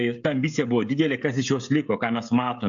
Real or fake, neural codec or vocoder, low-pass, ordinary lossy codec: fake; codec, 16 kHz, 16 kbps, FreqCodec, smaller model; 7.2 kHz; AAC, 64 kbps